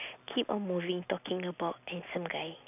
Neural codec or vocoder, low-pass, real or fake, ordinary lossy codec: none; 3.6 kHz; real; none